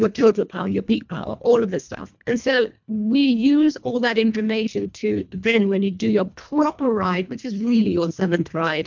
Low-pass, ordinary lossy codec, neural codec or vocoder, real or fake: 7.2 kHz; MP3, 64 kbps; codec, 24 kHz, 1.5 kbps, HILCodec; fake